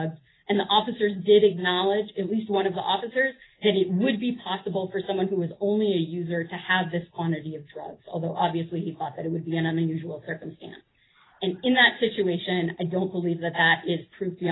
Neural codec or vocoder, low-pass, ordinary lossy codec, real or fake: none; 7.2 kHz; AAC, 16 kbps; real